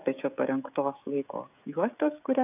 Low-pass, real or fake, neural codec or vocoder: 3.6 kHz; fake; codec, 16 kHz, 16 kbps, FreqCodec, smaller model